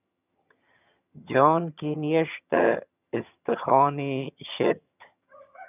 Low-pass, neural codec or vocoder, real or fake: 3.6 kHz; vocoder, 22.05 kHz, 80 mel bands, HiFi-GAN; fake